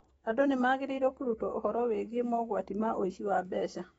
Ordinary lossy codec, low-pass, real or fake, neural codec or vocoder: AAC, 24 kbps; 19.8 kHz; fake; autoencoder, 48 kHz, 128 numbers a frame, DAC-VAE, trained on Japanese speech